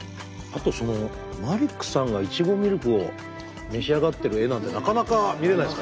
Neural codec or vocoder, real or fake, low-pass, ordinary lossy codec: none; real; none; none